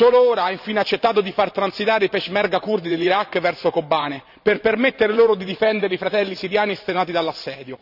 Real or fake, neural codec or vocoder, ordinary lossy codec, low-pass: fake; vocoder, 44.1 kHz, 128 mel bands every 512 samples, BigVGAN v2; none; 5.4 kHz